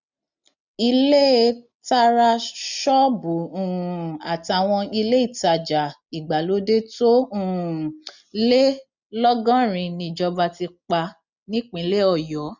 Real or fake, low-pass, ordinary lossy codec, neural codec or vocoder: real; 7.2 kHz; none; none